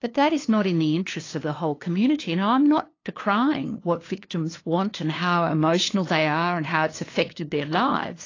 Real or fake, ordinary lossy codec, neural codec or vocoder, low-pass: fake; AAC, 32 kbps; codec, 16 kHz, 2 kbps, FunCodec, trained on LibriTTS, 25 frames a second; 7.2 kHz